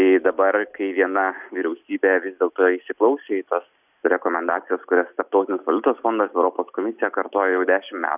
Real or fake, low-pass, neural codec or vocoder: real; 3.6 kHz; none